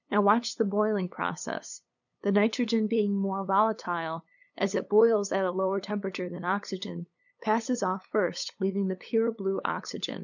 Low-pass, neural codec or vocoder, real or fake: 7.2 kHz; codec, 16 kHz, 8 kbps, FunCodec, trained on LibriTTS, 25 frames a second; fake